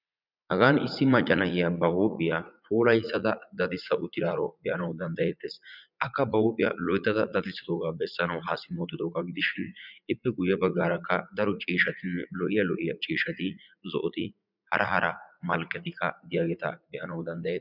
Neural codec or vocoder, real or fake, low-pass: vocoder, 44.1 kHz, 80 mel bands, Vocos; fake; 5.4 kHz